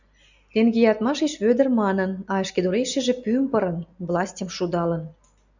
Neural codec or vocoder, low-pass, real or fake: none; 7.2 kHz; real